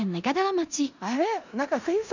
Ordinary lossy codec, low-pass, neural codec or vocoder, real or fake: none; 7.2 kHz; codec, 16 kHz in and 24 kHz out, 0.9 kbps, LongCat-Audio-Codec, four codebook decoder; fake